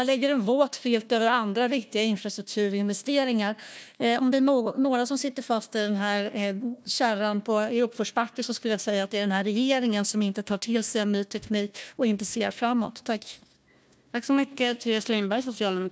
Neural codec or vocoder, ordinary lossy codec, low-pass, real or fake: codec, 16 kHz, 1 kbps, FunCodec, trained on Chinese and English, 50 frames a second; none; none; fake